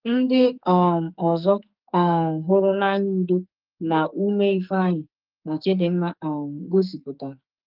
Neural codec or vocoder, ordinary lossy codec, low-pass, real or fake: codec, 44.1 kHz, 2.6 kbps, SNAC; Opus, 24 kbps; 5.4 kHz; fake